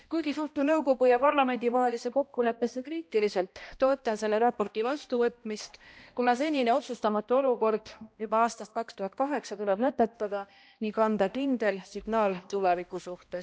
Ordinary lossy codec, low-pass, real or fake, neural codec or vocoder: none; none; fake; codec, 16 kHz, 1 kbps, X-Codec, HuBERT features, trained on balanced general audio